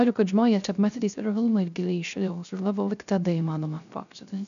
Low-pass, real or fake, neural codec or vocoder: 7.2 kHz; fake; codec, 16 kHz, 0.3 kbps, FocalCodec